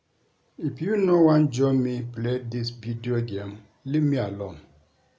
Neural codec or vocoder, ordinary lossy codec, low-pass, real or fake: none; none; none; real